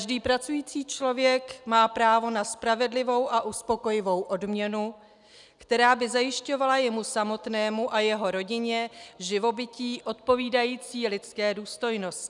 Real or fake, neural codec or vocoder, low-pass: real; none; 10.8 kHz